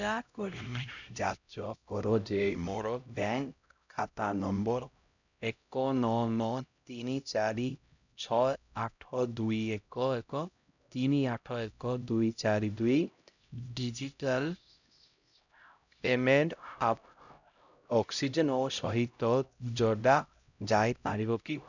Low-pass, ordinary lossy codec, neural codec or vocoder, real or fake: 7.2 kHz; none; codec, 16 kHz, 0.5 kbps, X-Codec, HuBERT features, trained on LibriSpeech; fake